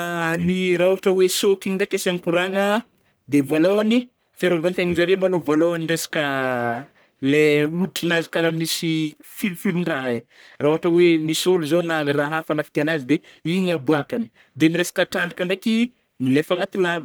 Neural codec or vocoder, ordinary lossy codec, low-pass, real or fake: codec, 44.1 kHz, 1.7 kbps, Pupu-Codec; none; none; fake